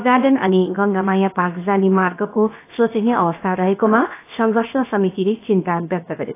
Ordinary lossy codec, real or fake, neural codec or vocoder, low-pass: AAC, 24 kbps; fake; codec, 16 kHz, 0.7 kbps, FocalCodec; 3.6 kHz